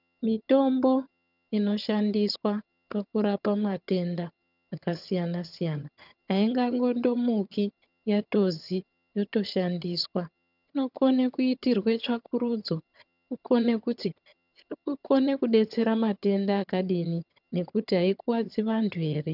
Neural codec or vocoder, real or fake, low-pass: vocoder, 22.05 kHz, 80 mel bands, HiFi-GAN; fake; 5.4 kHz